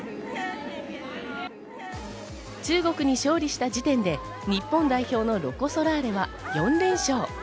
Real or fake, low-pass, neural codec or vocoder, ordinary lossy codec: real; none; none; none